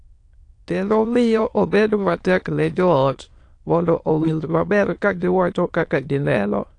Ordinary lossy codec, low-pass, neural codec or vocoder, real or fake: AAC, 48 kbps; 9.9 kHz; autoencoder, 22.05 kHz, a latent of 192 numbers a frame, VITS, trained on many speakers; fake